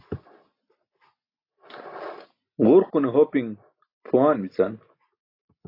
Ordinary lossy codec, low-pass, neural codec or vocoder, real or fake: AAC, 32 kbps; 5.4 kHz; none; real